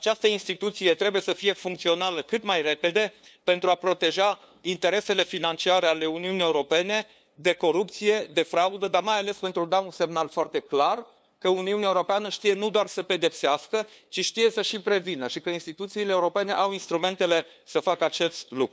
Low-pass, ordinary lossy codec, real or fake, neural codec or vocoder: none; none; fake; codec, 16 kHz, 2 kbps, FunCodec, trained on LibriTTS, 25 frames a second